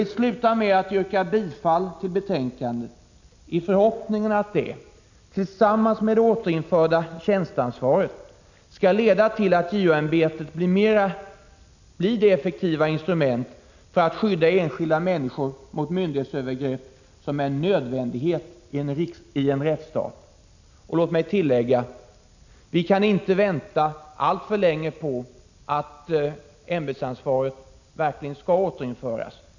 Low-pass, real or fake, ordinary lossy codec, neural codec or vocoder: 7.2 kHz; real; none; none